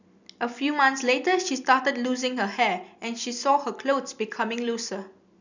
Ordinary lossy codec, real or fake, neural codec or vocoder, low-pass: none; real; none; 7.2 kHz